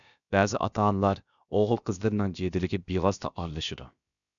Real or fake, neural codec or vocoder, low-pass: fake; codec, 16 kHz, about 1 kbps, DyCAST, with the encoder's durations; 7.2 kHz